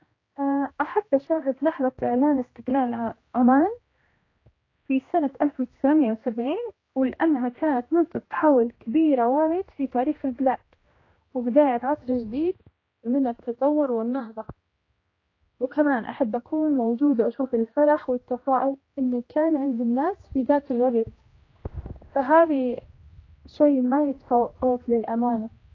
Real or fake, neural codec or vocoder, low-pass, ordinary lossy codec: fake; codec, 16 kHz, 1 kbps, X-Codec, HuBERT features, trained on general audio; 7.2 kHz; AAC, 32 kbps